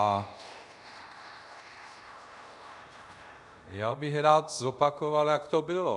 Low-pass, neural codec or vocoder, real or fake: 10.8 kHz; codec, 24 kHz, 0.5 kbps, DualCodec; fake